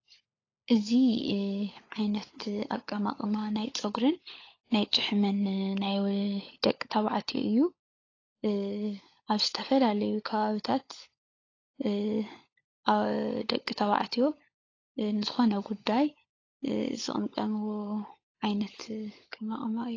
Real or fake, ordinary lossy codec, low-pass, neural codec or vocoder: fake; AAC, 32 kbps; 7.2 kHz; codec, 16 kHz, 16 kbps, FunCodec, trained on LibriTTS, 50 frames a second